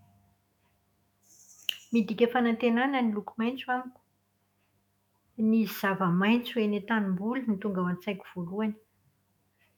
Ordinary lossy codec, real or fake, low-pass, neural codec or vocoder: MP3, 96 kbps; fake; 19.8 kHz; autoencoder, 48 kHz, 128 numbers a frame, DAC-VAE, trained on Japanese speech